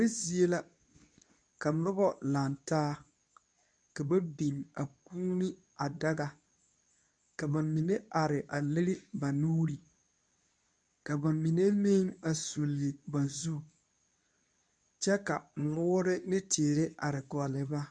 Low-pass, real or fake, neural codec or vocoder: 9.9 kHz; fake; codec, 24 kHz, 0.9 kbps, WavTokenizer, medium speech release version 2